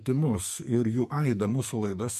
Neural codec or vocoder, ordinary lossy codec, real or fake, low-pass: codec, 44.1 kHz, 2.6 kbps, SNAC; MP3, 64 kbps; fake; 14.4 kHz